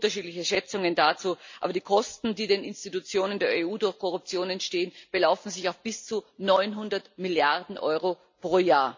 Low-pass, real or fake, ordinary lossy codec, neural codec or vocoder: 7.2 kHz; real; MP3, 64 kbps; none